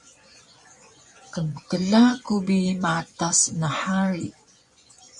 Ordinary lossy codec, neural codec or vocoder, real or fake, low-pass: MP3, 64 kbps; vocoder, 24 kHz, 100 mel bands, Vocos; fake; 10.8 kHz